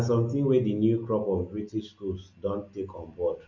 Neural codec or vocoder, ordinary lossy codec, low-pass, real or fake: none; none; 7.2 kHz; real